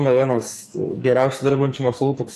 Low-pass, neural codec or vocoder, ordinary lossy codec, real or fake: 14.4 kHz; codec, 44.1 kHz, 2.6 kbps, SNAC; AAC, 64 kbps; fake